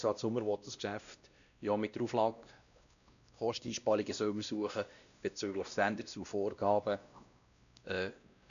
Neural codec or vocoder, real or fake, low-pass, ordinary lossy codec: codec, 16 kHz, 1 kbps, X-Codec, WavLM features, trained on Multilingual LibriSpeech; fake; 7.2 kHz; none